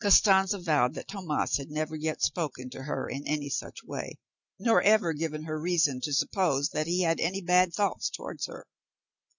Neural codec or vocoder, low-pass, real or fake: none; 7.2 kHz; real